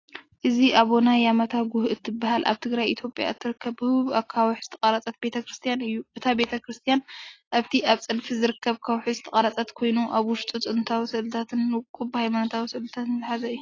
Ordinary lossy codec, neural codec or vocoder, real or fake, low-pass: AAC, 32 kbps; none; real; 7.2 kHz